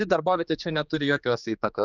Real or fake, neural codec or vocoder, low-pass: fake; codec, 16 kHz, 2 kbps, X-Codec, HuBERT features, trained on general audio; 7.2 kHz